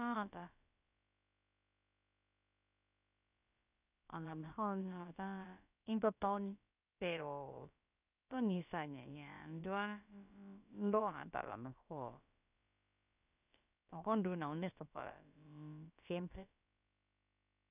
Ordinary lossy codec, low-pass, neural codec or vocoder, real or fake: none; 3.6 kHz; codec, 16 kHz, about 1 kbps, DyCAST, with the encoder's durations; fake